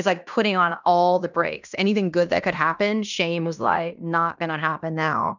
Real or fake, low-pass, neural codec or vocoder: fake; 7.2 kHz; codec, 16 kHz in and 24 kHz out, 0.9 kbps, LongCat-Audio-Codec, fine tuned four codebook decoder